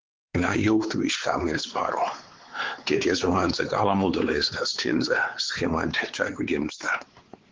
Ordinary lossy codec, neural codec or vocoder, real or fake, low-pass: Opus, 16 kbps; codec, 16 kHz, 4 kbps, X-Codec, WavLM features, trained on Multilingual LibriSpeech; fake; 7.2 kHz